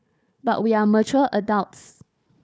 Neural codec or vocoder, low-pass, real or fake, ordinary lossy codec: codec, 16 kHz, 4 kbps, FunCodec, trained on Chinese and English, 50 frames a second; none; fake; none